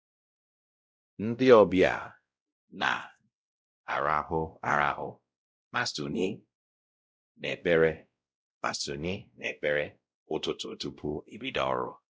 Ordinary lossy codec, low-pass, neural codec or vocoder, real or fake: none; none; codec, 16 kHz, 0.5 kbps, X-Codec, WavLM features, trained on Multilingual LibriSpeech; fake